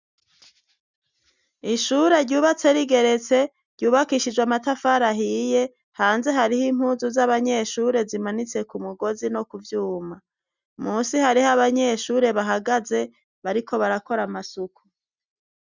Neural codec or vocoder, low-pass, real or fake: none; 7.2 kHz; real